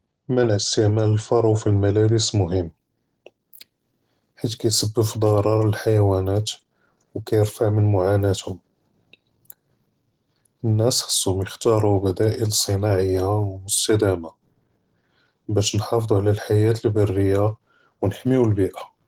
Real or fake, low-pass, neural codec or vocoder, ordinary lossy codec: real; 19.8 kHz; none; Opus, 16 kbps